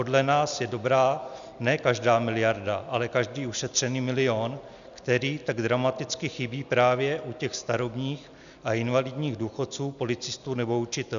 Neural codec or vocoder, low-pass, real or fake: none; 7.2 kHz; real